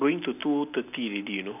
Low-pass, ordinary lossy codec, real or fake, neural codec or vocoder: 3.6 kHz; none; real; none